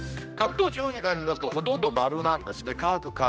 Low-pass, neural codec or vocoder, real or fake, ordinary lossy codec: none; codec, 16 kHz, 1 kbps, X-Codec, HuBERT features, trained on general audio; fake; none